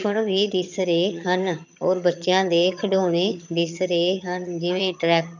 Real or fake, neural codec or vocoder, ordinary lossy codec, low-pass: fake; vocoder, 22.05 kHz, 80 mel bands, HiFi-GAN; none; 7.2 kHz